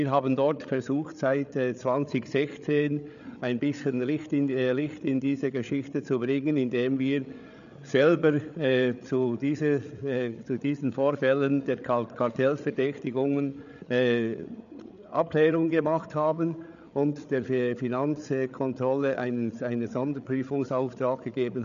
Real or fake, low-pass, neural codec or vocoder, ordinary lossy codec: fake; 7.2 kHz; codec, 16 kHz, 8 kbps, FreqCodec, larger model; none